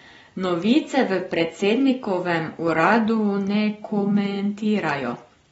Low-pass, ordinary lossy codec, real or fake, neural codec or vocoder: 19.8 kHz; AAC, 24 kbps; real; none